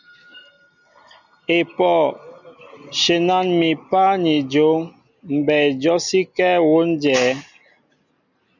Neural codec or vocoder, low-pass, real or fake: none; 7.2 kHz; real